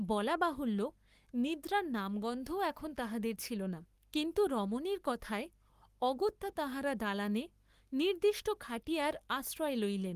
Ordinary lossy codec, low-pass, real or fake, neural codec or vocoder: Opus, 24 kbps; 14.4 kHz; fake; codec, 44.1 kHz, 7.8 kbps, Pupu-Codec